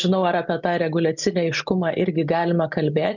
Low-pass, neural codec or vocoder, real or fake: 7.2 kHz; none; real